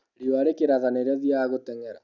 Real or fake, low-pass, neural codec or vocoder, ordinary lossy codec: real; 7.2 kHz; none; none